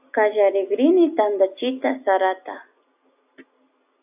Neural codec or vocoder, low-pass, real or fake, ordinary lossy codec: none; 3.6 kHz; real; AAC, 32 kbps